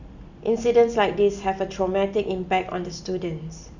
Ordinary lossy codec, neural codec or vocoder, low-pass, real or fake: none; none; 7.2 kHz; real